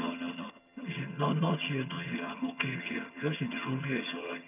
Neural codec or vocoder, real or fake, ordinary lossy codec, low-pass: vocoder, 22.05 kHz, 80 mel bands, HiFi-GAN; fake; none; 3.6 kHz